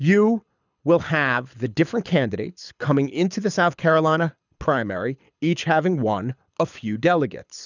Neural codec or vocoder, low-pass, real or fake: codec, 24 kHz, 6 kbps, HILCodec; 7.2 kHz; fake